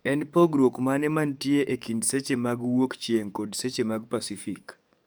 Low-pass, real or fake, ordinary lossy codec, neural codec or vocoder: none; fake; none; codec, 44.1 kHz, 7.8 kbps, DAC